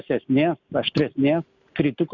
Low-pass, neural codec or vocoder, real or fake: 7.2 kHz; none; real